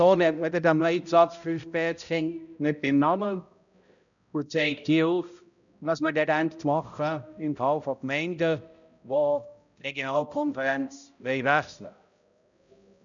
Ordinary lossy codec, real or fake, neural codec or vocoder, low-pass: none; fake; codec, 16 kHz, 0.5 kbps, X-Codec, HuBERT features, trained on balanced general audio; 7.2 kHz